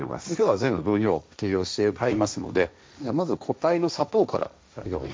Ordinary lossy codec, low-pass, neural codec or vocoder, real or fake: none; none; codec, 16 kHz, 1.1 kbps, Voila-Tokenizer; fake